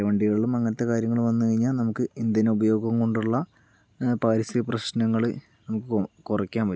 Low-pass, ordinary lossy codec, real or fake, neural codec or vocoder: none; none; real; none